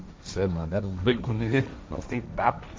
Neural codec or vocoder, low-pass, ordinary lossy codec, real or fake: codec, 16 kHz, 1.1 kbps, Voila-Tokenizer; none; none; fake